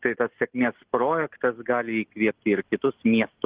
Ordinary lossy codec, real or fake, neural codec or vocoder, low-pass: Opus, 16 kbps; real; none; 3.6 kHz